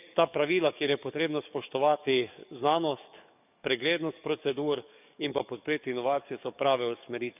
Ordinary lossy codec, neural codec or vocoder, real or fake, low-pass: none; codec, 44.1 kHz, 7.8 kbps, DAC; fake; 3.6 kHz